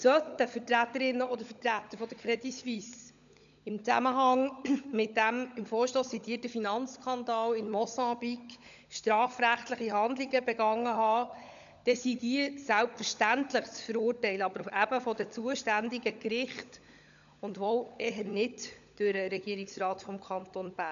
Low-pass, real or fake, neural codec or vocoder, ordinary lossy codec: 7.2 kHz; fake; codec, 16 kHz, 16 kbps, FunCodec, trained on LibriTTS, 50 frames a second; none